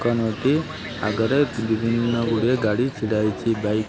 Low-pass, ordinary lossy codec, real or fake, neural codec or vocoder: none; none; real; none